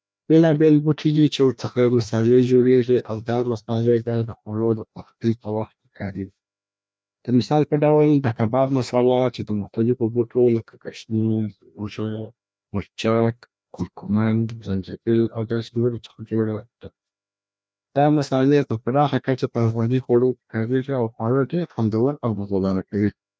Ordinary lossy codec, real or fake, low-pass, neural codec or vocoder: none; fake; none; codec, 16 kHz, 1 kbps, FreqCodec, larger model